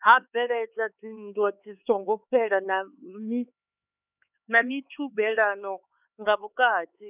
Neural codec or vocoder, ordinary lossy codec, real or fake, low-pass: codec, 16 kHz, 4 kbps, X-Codec, HuBERT features, trained on LibriSpeech; none; fake; 3.6 kHz